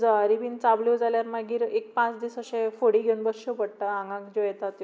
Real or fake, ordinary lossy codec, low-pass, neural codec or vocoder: real; none; none; none